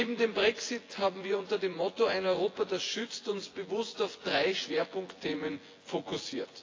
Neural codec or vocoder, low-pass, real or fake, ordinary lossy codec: vocoder, 24 kHz, 100 mel bands, Vocos; 7.2 kHz; fake; AAC, 32 kbps